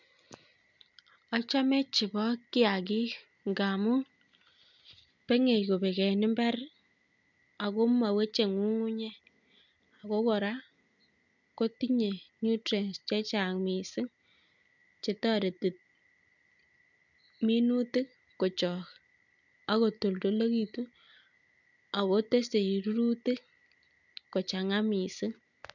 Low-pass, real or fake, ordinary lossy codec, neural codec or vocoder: 7.2 kHz; real; none; none